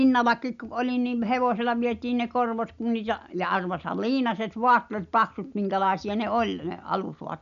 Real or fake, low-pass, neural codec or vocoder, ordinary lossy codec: real; 7.2 kHz; none; none